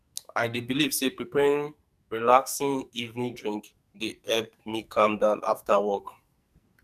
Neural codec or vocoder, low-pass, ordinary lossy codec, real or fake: codec, 44.1 kHz, 2.6 kbps, SNAC; 14.4 kHz; none; fake